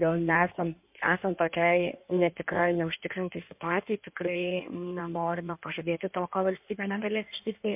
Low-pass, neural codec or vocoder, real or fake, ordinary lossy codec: 3.6 kHz; codec, 16 kHz in and 24 kHz out, 1.1 kbps, FireRedTTS-2 codec; fake; MP3, 32 kbps